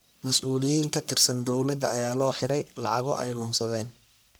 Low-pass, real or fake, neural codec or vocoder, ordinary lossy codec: none; fake; codec, 44.1 kHz, 1.7 kbps, Pupu-Codec; none